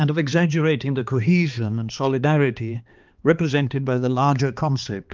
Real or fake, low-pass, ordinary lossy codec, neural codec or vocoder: fake; 7.2 kHz; Opus, 24 kbps; codec, 16 kHz, 2 kbps, X-Codec, HuBERT features, trained on balanced general audio